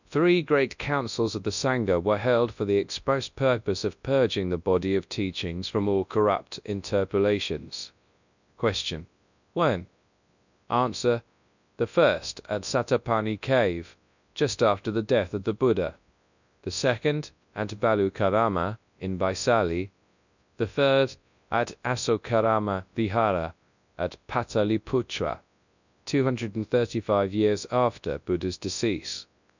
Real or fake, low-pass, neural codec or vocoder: fake; 7.2 kHz; codec, 24 kHz, 0.9 kbps, WavTokenizer, large speech release